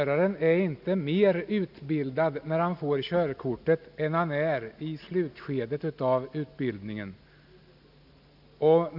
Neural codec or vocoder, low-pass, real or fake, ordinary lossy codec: none; 5.4 kHz; real; none